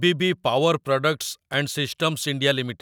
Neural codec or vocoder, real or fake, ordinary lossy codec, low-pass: none; real; none; none